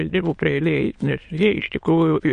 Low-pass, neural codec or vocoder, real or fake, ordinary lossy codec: 9.9 kHz; autoencoder, 22.05 kHz, a latent of 192 numbers a frame, VITS, trained on many speakers; fake; MP3, 48 kbps